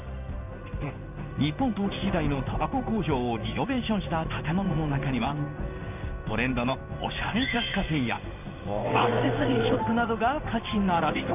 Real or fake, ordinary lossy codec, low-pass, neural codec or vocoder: fake; none; 3.6 kHz; codec, 16 kHz in and 24 kHz out, 1 kbps, XY-Tokenizer